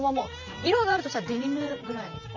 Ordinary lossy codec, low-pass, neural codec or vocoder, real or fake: none; 7.2 kHz; vocoder, 44.1 kHz, 128 mel bands, Pupu-Vocoder; fake